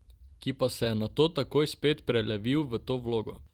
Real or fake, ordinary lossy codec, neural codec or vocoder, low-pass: fake; Opus, 24 kbps; vocoder, 44.1 kHz, 128 mel bands every 512 samples, BigVGAN v2; 19.8 kHz